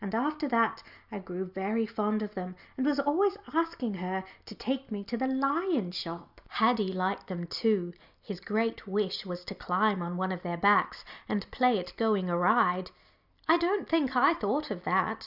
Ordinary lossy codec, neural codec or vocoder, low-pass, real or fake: Opus, 64 kbps; none; 5.4 kHz; real